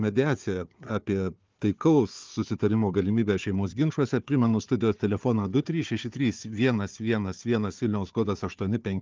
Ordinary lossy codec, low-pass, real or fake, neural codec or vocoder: Opus, 32 kbps; 7.2 kHz; fake; codec, 16 kHz, 4 kbps, FunCodec, trained on Chinese and English, 50 frames a second